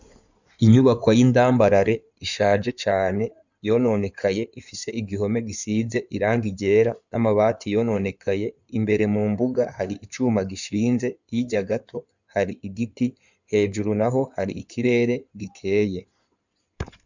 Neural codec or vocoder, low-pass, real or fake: codec, 16 kHz in and 24 kHz out, 2.2 kbps, FireRedTTS-2 codec; 7.2 kHz; fake